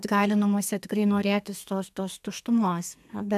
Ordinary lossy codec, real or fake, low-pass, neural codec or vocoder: AAC, 96 kbps; fake; 14.4 kHz; codec, 32 kHz, 1.9 kbps, SNAC